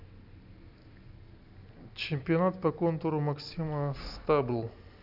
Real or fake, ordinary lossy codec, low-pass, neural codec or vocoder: real; none; 5.4 kHz; none